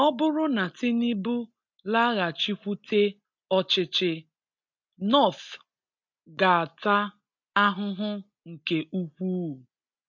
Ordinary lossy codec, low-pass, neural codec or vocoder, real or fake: MP3, 64 kbps; 7.2 kHz; none; real